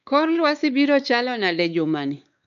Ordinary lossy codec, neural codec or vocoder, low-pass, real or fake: none; codec, 16 kHz, 4 kbps, X-Codec, WavLM features, trained on Multilingual LibriSpeech; 7.2 kHz; fake